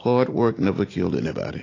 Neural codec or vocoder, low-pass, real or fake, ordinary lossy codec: none; 7.2 kHz; real; AAC, 32 kbps